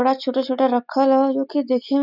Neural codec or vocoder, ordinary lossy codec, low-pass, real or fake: none; none; 5.4 kHz; real